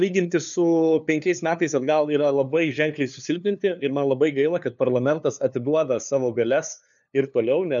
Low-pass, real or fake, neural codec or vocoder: 7.2 kHz; fake; codec, 16 kHz, 2 kbps, FunCodec, trained on LibriTTS, 25 frames a second